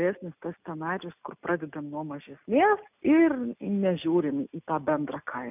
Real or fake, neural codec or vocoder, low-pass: real; none; 3.6 kHz